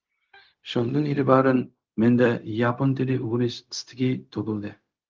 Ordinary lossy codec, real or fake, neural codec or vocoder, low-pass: Opus, 24 kbps; fake; codec, 16 kHz, 0.4 kbps, LongCat-Audio-Codec; 7.2 kHz